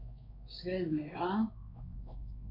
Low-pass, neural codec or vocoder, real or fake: 5.4 kHz; codec, 16 kHz, 2 kbps, X-Codec, WavLM features, trained on Multilingual LibriSpeech; fake